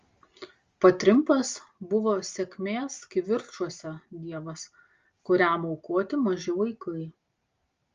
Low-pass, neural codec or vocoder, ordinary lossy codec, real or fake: 7.2 kHz; none; Opus, 32 kbps; real